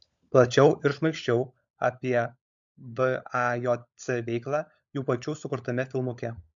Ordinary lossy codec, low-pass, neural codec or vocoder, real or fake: MP3, 64 kbps; 7.2 kHz; codec, 16 kHz, 16 kbps, FunCodec, trained on LibriTTS, 50 frames a second; fake